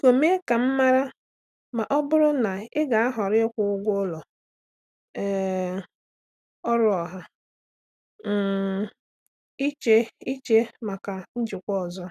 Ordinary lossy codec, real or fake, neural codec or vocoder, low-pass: none; real; none; 14.4 kHz